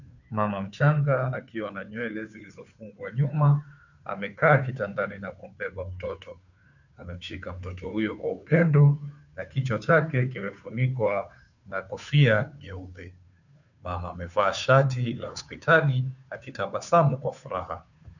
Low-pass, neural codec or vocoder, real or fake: 7.2 kHz; codec, 16 kHz, 2 kbps, FunCodec, trained on Chinese and English, 25 frames a second; fake